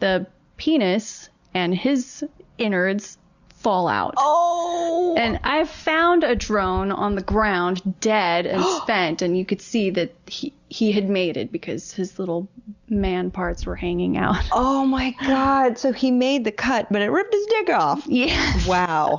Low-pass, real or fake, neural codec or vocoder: 7.2 kHz; real; none